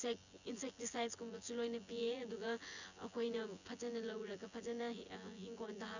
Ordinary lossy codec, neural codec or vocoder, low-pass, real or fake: none; vocoder, 24 kHz, 100 mel bands, Vocos; 7.2 kHz; fake